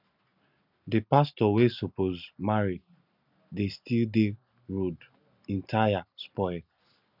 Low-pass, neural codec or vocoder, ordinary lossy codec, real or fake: 5.4 kHz; none; none; real